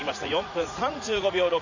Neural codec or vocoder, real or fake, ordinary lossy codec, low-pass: vocoder, 44.1 kHz, 80 mel bands, Vocos; fake; none; 7.2 kHz